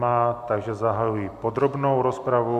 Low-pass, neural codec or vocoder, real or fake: 14.4 kHz; none; real